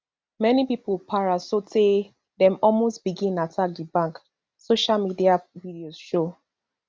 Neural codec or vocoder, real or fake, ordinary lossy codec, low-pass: none; real; none; none